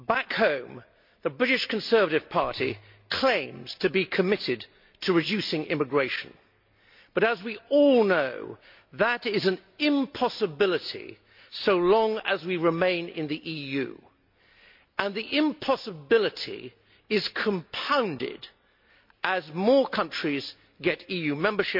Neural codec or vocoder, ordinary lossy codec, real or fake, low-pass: none; MP3, 48 kbps; real; 5.4 kHz